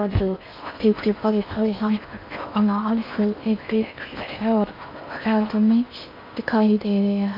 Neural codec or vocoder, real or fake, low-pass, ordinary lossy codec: codec, 16 kHz in and 24 kHz out, 0.6 kbps, FocalCodec, streaming, 4096 codes; fake; 5.4 kHz; none